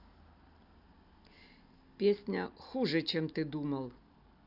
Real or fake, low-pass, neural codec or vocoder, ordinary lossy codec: real; 5.4 kHz; none; none